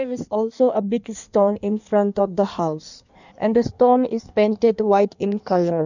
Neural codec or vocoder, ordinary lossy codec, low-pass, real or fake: codec, 16 kHz in and 24 kHz out, 1.1 kbps, FireRedTTS-2 codec; none; 7.2 kHz; fake